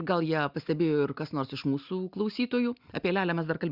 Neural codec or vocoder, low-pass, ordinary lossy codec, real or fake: none; 5.4 kHz; Opus, 64 kbps; real